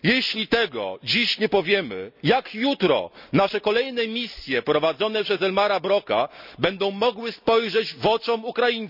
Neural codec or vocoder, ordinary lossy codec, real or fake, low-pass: none; none; real; 5.4 kHz